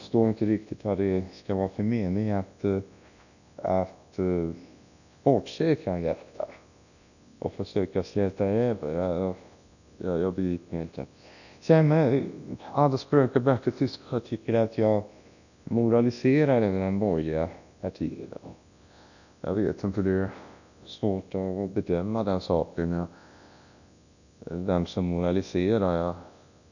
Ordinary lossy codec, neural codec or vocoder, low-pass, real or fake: none; codec, 24 kHz, 0.9 kbps, WavTokenizer, large speech release; 7.2 kHz; fake